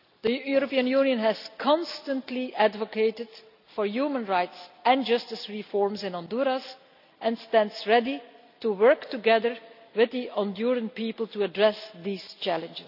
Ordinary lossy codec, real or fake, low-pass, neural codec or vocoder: none; real; 5.4 kHz; none